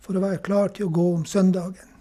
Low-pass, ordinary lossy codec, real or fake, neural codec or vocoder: 14.4 kHz; none; real; none